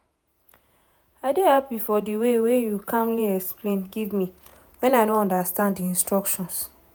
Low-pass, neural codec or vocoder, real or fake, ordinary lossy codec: none; vocoder, 48 kHz, 128 mel bands, Vocos; fake; none